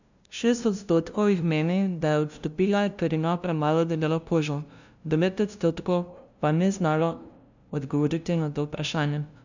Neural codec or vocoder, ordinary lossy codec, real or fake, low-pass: codec, 16 kHz, 0.5 kbps, FunCodec, trained on LibriTTS, 25 frames a second; none; fake; 7.2 kHz